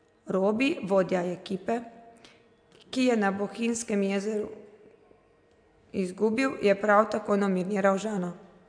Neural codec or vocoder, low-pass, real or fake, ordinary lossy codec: none; 9.9 kHz; real; AAC, 64 kbps